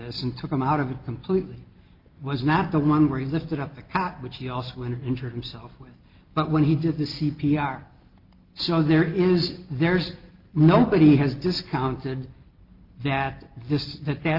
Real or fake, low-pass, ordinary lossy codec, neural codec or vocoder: real; 5.4 kHz; Opus, 32 kbps; none